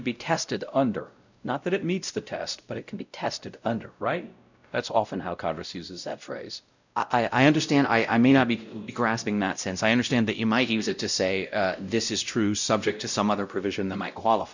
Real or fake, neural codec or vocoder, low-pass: fake; codec, 16 kHz, 0.5 kbps, X-Codec, WavLM features, trained on Multilingual LibriSpeech; 7.2 kHz